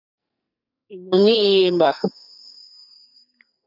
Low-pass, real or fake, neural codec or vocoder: 5.4 kHz; fake; codec, 44.1 kHz, 2.6 kbps, SNAC